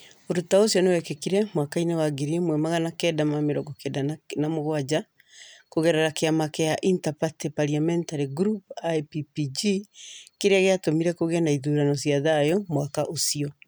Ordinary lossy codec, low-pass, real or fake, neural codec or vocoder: none; none; real; none